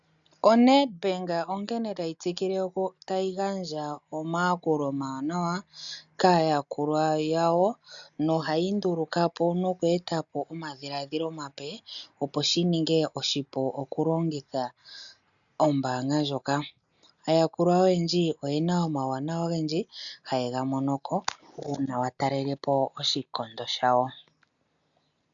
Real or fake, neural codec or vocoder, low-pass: real; none; 7.2 kHz